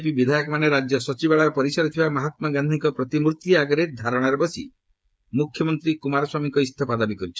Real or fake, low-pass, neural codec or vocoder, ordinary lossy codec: fake; none; codec, 16 kHz, 8 kbps, FreqCodec, smaller model; none